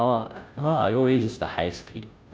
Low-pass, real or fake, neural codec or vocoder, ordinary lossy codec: none; fake; codec, 16 kHz, 0.5 kbps, FunCodec, trained on Chinese and English, 25 frames a second; none